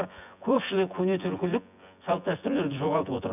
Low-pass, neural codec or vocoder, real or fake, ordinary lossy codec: 3.6 kHz; vocoder, 24 kHz, 100 mel bands, Vocos; fake; none